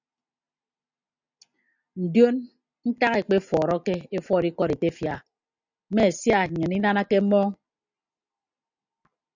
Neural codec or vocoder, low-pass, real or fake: none; 7.2 kHz; real